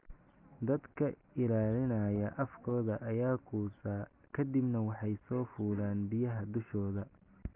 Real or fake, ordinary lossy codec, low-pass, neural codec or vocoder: real; Opus, 32 kbps; 3.6 kHz; none